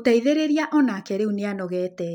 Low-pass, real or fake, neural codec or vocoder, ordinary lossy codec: 19.8 kHz; real; none; none